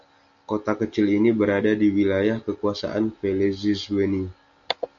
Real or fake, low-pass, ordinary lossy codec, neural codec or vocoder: real; 7.2 kHz; AAC, 64 kbps; none